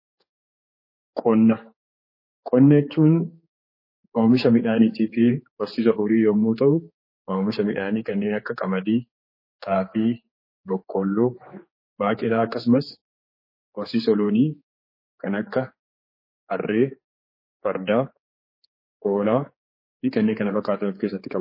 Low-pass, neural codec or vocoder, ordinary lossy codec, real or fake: 5.4 kHz; codec, 16 kHz, 4 kbps, X-Codec, HuBERT features, trained on general audio; MP3, 24 kbps; fake